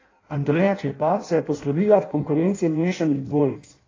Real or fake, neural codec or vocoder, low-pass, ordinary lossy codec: fake; codec, 16 kHz in and 24 kHz out, 0.6 kbps, FireRedTTS-2 codec; 7.2 kHz; AAC, 32 kbps